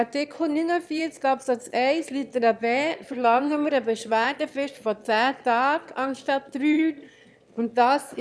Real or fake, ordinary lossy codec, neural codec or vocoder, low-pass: fake; none; autoencoder, 22.05 kHz, a latent of 192 numbers a frame, VITS, trained on one speaker; none